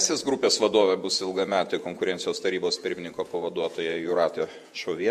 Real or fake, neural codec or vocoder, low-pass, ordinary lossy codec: fake; vocoder, 48 kHz, 128 mel bands, Vocos; 14.4 kHz; MP3, 64 kbps